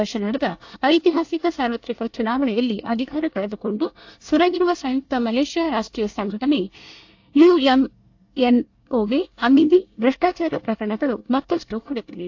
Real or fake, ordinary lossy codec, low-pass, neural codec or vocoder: fake; AAC, 48 kbps; 7.2 kHz; codec, 24 kHz, 1 kbps, SNAC